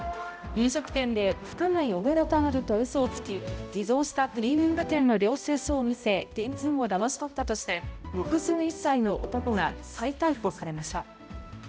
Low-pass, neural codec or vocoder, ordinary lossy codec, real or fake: none; codec, 16 kHz, 0.5 kbps, X-Codec, HuBERT features, trained on balanced general audio; none; fake